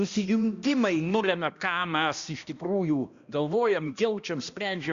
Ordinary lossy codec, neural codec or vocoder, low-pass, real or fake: Opus, 64 kbps; codec, 16 kHz, 1 kbps, X-Codec, HuBERT features, trained on balanced general audio; 7.2 kHz; fake